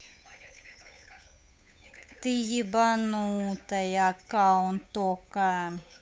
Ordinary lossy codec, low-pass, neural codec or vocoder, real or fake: none; none; codec, 16 kHz, 8 kbps, FunCodec, trained on Chinese and English, 25 frames a second; fake